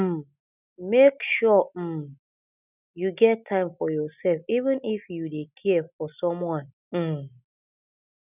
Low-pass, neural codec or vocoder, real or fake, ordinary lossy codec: 3.6 kHz; none; real; none